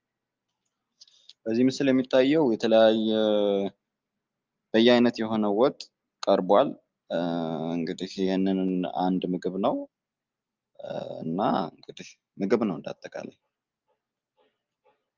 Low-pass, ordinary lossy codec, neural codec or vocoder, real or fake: 7.2 kHz; Opus, 32 kbps; none; real